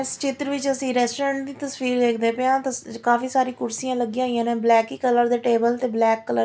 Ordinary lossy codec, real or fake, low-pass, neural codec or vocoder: none; real; none; none